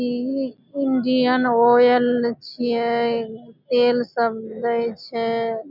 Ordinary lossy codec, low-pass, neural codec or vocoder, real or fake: none; 5.4 kHz; none; real